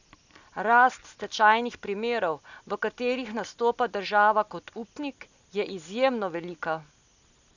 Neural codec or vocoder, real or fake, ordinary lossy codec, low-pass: none; real; none; 7.2 kHz